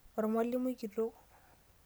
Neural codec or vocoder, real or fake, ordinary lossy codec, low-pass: none; real; none; none